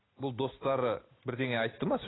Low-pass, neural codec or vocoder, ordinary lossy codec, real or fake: 7.2 kHz; none; AAC, 16 kbps; real